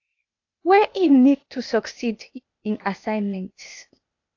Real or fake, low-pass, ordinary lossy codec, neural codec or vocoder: fake; 7.2 kHz; AAC, 48 kbps; codec, 16 kHz, 0.8 kbps, ZipCodec